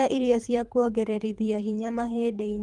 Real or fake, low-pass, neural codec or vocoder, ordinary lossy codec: fake; 10.8 kHz; codec, 24 kHz, 3 kbps, HILCodec; Opus, 32 kbps